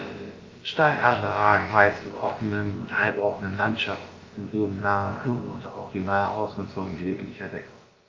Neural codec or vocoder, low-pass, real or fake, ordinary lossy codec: codec, 16 kHz, about 1 kbps, DyCAST, with the encoder's durations; 7.2 kHz; fake; Opus, 32 kbps